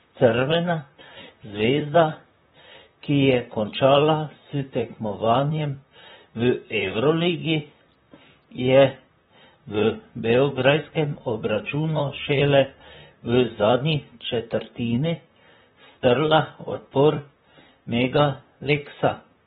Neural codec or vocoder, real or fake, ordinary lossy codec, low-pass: vocoder, 44.1 kHz, 128 mel bands, Pupu-Vocoder; fake; AAC, 16 kbps; 19.8 kHz